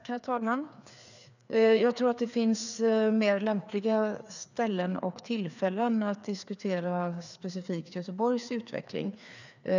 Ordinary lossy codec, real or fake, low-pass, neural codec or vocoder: none; fake; 7.2 kHz; codec, 16 kHz, 2 kbps, FreqCodec, larger model